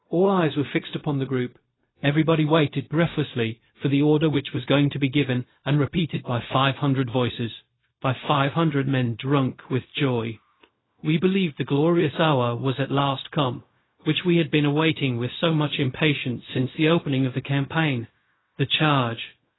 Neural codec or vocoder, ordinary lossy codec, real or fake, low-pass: codec, 16 kHz, 0.4 kbps, LongCat-Audio-Codec; AAC, 16 kbps; fake; 7.2 kHz